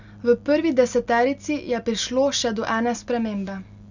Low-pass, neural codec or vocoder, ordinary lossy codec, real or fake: 7.2 kHz; none; none; real